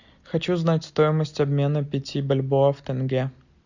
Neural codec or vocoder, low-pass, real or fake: none; 7.2 kHz; real